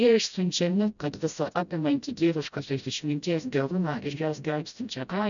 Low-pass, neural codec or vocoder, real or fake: 7.2 kHz; codec, 16 kHz, 0.5 kbps, FreqCodec, smaller model; fake